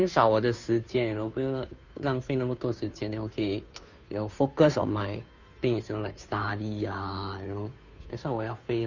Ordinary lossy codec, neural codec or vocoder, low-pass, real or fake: none; codec, 16 kHz, 2 kbps, FunCodec, trained on Chinese and English, 25 frames a second; 7.2 kHz; fake